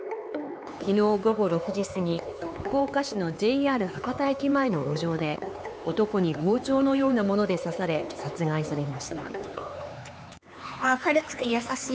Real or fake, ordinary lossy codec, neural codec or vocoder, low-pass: fake; none; codec, 16 kHz, 4 kbps, X-Codec, HuBERT features, trained on LibriSpeech; none